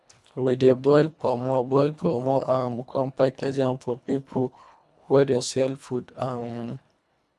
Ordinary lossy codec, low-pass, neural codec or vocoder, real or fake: none; none; codec, 24 kHz, 1.5 kbps, HILCodec; fake